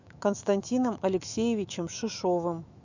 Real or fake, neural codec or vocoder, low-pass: fake; autoencoder, 48 kHz, 128 numbers a frame, DAC-VAE, trained on Japanese speech; 7.2 kHz